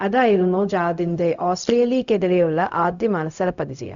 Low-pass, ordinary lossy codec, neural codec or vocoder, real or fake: 7.2 kHz; Opus, 64 kbps; codec, 16 kHz, 0.4 kbps, LongCat-Audio-Codec; fake